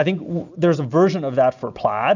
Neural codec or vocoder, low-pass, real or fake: none; 7.2 kHz; real